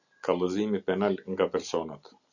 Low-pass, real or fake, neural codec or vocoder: 7.2 kHz; real; none